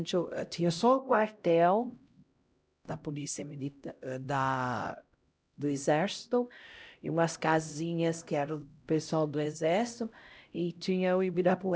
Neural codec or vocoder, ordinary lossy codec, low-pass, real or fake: codec, 16 kHz, 0.5 kbps, X-Codec, HuBERT features, trained on LibriSpeech; none; none; fake